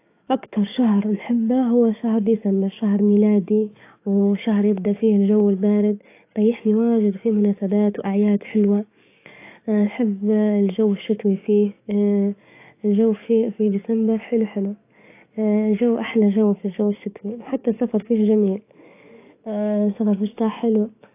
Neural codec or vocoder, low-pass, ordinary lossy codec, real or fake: codec, 44.1 kHz, 7.8 kbps, DAC; 3.6 kHz; AAC, 24 kbps; fake